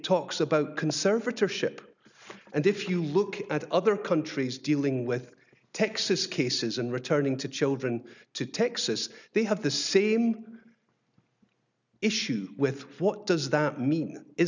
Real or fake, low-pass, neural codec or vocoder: real; 7.2 kHz; none